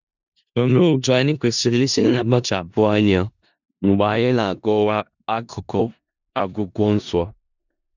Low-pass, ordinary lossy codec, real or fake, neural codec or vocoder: 7.2 kHz; none; fake; codec, 16 kHz in and 24 kHz out, 0.4 kbps, LongCat-Audio-Codec, four codebook decoder